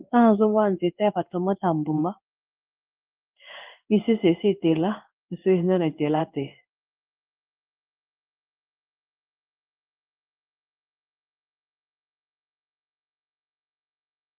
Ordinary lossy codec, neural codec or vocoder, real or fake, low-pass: Opus, 32 kbps; codec, 16 kHz in and 24 kHz out, 1 kbps, XY-Tokenizer; fake; 3.6 kHz